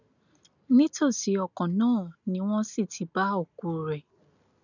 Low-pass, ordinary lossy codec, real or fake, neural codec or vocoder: 7.2 kHz; none; real; none